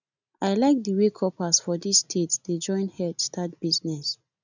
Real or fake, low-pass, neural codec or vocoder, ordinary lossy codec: real; 7.2 kHz; none; none